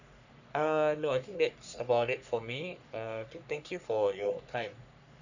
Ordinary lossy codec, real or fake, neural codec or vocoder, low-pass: none; fake; codec, 44.1 kHz, 3.4 kbps, Pupu-Codec; 7.2 kHz